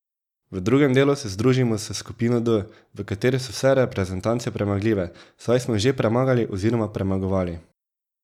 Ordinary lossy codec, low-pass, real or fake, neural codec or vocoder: none; 19.8 kHz; real; none